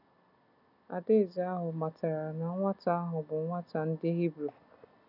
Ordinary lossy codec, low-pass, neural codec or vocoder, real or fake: none; 5.4 kHz; none; real